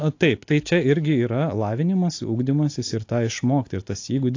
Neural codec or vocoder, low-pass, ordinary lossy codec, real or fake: none; 7.2 kHz; AAC, 48 kbps; real